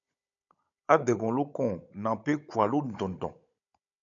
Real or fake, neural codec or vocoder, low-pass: fake; codec, 16 kHz, 16 kbps, FunCodec, trained on Chinese and English, 50 frames a second; 7.2 kHz